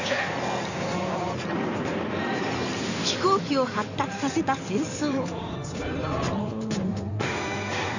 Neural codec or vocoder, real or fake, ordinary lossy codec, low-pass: codec, 16 kHz in and 24 kHz out, 2.2 kbps, FireRedTTS-2 codec; fake; none; 7.2 kHz